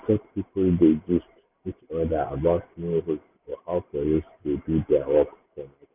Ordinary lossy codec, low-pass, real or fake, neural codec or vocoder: none; 3.6 kHz; real; none